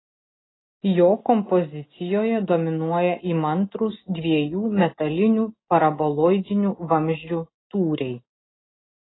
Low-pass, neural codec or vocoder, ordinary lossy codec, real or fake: 7.2 kHz; none; AAC, 16 kbps; real